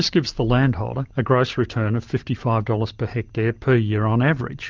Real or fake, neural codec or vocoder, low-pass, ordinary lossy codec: real; none; 7.2 kHz; Opus, 32 kbps